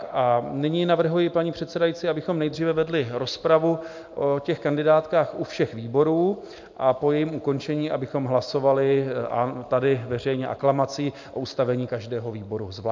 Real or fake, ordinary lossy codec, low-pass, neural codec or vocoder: real; MP3, 64 kbps; 7.2 kHz; none